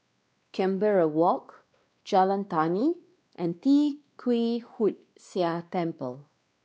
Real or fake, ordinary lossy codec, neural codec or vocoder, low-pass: fake; none; codec, 16 kHz, 2 kbps, X-Codec, WavLM features, trained on Multilingual LibriSpeech; none